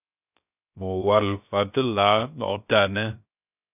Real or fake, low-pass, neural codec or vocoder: fake; 3.6 kHz; codec, 16 kHz, 0.3 kbps, FocalCodec